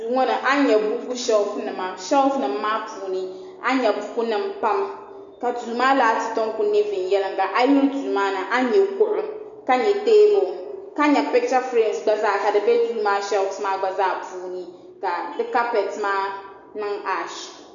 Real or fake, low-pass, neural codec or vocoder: real; 7.2 kHz; none